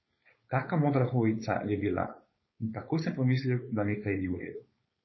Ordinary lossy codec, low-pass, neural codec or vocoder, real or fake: MP3, 24 kbps; 7.2 kHz; codec, 16 kHz, 4.8 kbps, FACodec; fake